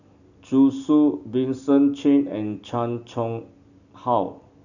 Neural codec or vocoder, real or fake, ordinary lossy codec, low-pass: none; real; none; 7.2 kHz